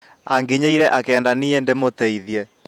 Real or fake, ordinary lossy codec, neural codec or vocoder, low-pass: fake; none; vocoder, 48 kHz, 128 mel bands, Vocos; 19.8 kHz